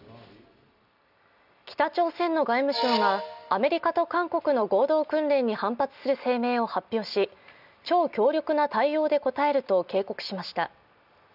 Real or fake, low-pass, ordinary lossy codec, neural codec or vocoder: real; 5.4 kHz; none; none